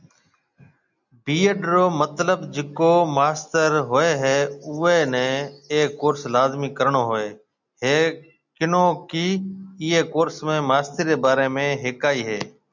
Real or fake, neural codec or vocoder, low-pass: real; none; 7.2 kHz